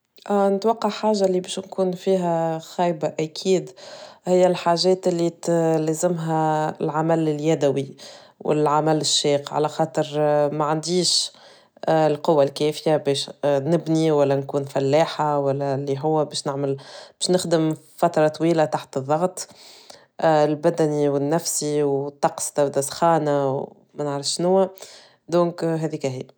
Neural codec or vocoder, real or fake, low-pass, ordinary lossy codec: none; real; none; none